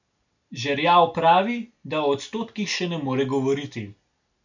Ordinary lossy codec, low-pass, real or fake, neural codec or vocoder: none; 7.2 kHz; real; none